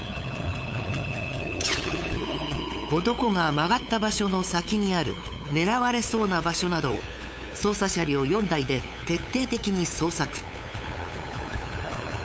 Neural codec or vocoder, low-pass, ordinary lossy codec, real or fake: codec, 16 kHz, 8 kbps, FunCodec, trained on LibriTTS, 25 frames a second; none; none; fake